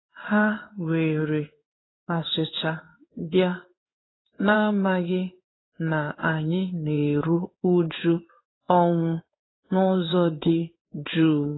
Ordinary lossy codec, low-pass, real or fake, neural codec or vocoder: AAC, 16 kbps; 7.2 kHz; fake; codec, 16 kHz in and 24 kHz out, 1 kbps, XY-Tokenizer